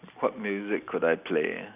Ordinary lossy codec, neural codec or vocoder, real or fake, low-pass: none; none; real; 3.6 kHz